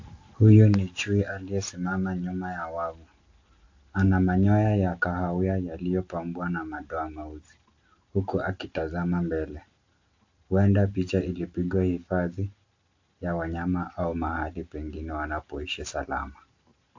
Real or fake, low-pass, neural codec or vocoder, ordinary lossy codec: real; 7.2 kHz; none; AAC, 48 kbps